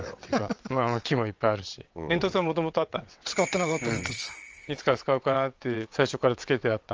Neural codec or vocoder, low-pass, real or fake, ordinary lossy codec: vocoder, 22.05 kHz, 80 mel bands, WaveNeXt; 7.2 kHz; fake; Opus, 24 kbps